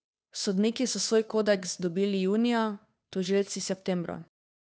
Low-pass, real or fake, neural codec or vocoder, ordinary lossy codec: none; fake; codec, 16 kHz, 2 kbps, FunCodec, trained on Chinese and English, 25 frames a second; none